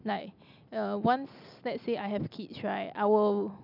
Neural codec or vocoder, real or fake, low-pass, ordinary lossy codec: none; real; 5.4 kHz; none